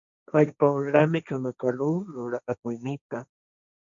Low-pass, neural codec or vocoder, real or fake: 7.2 kHz; codec, 16 kHz, 1.1 kbps, Voila-Tokenizer; fake